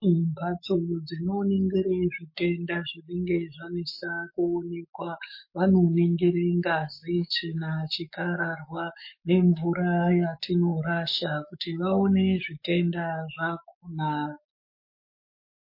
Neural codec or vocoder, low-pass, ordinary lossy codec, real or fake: autoencoder, 48 kHz, 128 numbers a frame, DAC-VAE, trained on Japanese speech; 5.4 kHz; MP3, 24 kbps; fake